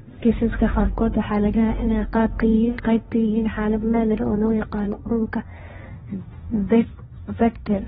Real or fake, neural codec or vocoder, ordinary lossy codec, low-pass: fake; codec, 16 kHz, 1.1 kbps, Voila-Tokenizer; AAC, 16 kbps; 7.2 kHz